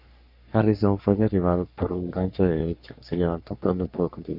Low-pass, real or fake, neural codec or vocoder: 5.4 kHz; fake; codec, 44.1 kHz, 3.4 kbps, Pupu-Codec